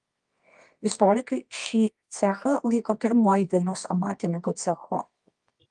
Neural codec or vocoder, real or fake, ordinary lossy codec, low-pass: codec, 24 kHz, 0.9 kbps, WavTokenizer, medium music audio release; fake; Opus, 32 kbps; 10.8 kHz